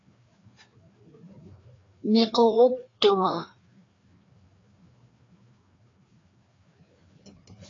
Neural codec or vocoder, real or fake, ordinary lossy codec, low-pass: codec, 16 kHz, 2 kbps, FreqCodec, larger model; fake; AAC, 32 kbps; 7.2 kHz